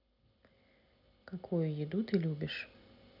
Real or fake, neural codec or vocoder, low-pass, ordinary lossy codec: real; none; 5.4 kHz; none